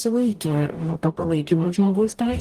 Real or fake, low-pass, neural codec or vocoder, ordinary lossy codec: fake; 14.4 kHz; codec, 44.1 kHz, 0.9 kbps, DAC; Opus, 24 kbps